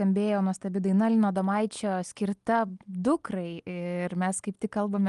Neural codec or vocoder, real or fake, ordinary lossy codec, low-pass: none; real; Opus, 32 kbps; 10.8 kHz